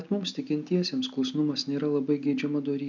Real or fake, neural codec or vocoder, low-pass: real; none; 7.2 kHz